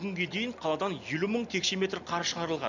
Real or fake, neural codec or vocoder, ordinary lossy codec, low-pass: real; none; none; 7.2 kHz